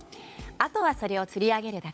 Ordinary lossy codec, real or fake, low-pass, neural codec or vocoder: none; fake; none; codec, 16 kHz, 16 kbps, FunCodec, trained on LibriTTS, 50 frames a second